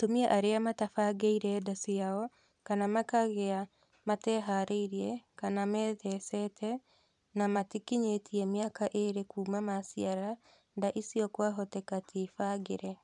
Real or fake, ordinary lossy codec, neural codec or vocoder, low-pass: fake; none; vocoder, 44.1 kHz, 128 mel bands every 512 samples, BigVGAN v2; 10.8 kHz